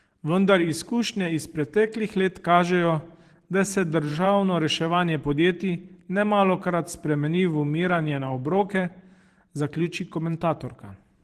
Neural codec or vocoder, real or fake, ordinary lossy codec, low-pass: vocoder, 44.1 kHz, 128 mel bands every 512 samples, BigVGAN v2; fake; Opus, 16 kbps; 14.4 kHz